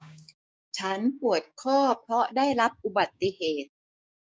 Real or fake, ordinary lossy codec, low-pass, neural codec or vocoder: fake; none; none; codec, 16 kHz, 6 kbps, DAC